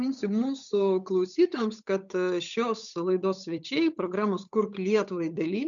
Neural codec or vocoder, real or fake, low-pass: codec, 16 kHz, 8 kbps, FunCodec, trained on Chinese and English, 25 frames a second; fake; 7.2 kHz